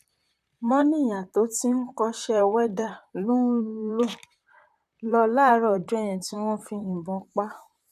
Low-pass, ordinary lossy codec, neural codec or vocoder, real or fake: 14.4 kHz; none; vocoder, 44.1 kHz, 128 mel bands, Pupu-Vocoder; fake